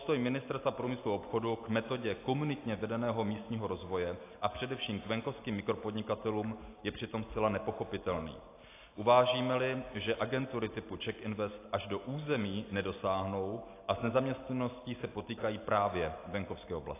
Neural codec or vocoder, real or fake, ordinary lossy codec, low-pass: none; real; AAC, 24 kbps; 3.6 kHz